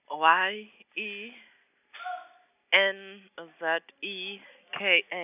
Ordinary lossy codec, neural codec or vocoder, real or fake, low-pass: none; none; real; 3.6 kHz